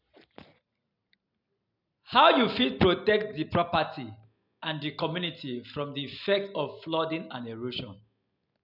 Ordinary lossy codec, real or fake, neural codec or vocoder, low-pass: none; real; none; 5.4 kHz